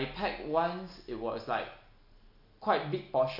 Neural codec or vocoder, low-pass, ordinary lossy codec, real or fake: none; 5.4 kHz; MP3, 48 kbps; real